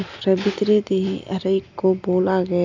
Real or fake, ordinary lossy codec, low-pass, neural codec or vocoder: real; AAC, 48 kbps; 7.2 kHz; none